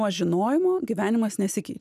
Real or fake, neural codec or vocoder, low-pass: real; none; 14.4 kHz